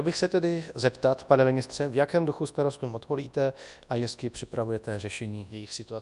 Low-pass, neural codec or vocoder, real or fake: 10.8 kHz; codec, 24 kHz, 0.9 kbps, WavTokenizer, large speech release; fake